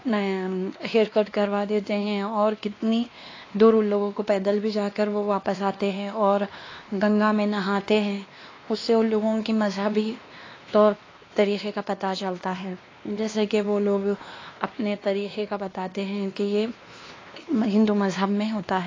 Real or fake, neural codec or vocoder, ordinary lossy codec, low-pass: fake; codec, 16 kHz, 2 kbps, X-Codec, WavLM features, trained on Multilingual LibriSpeech; AAC, 32 kbps; 7.2 kHz